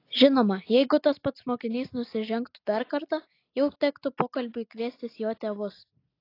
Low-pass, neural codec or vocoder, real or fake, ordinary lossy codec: 5.4 kHz; none; real; AAC, 32 kbps